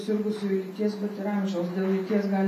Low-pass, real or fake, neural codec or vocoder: 14.4 kHz; real; none